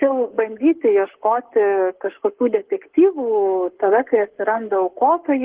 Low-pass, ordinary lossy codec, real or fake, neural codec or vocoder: 3.6 kHz; Opus, 16 kbps; fake; codec, 44.1 kHz, 7.8 kbps, Pupu-Codec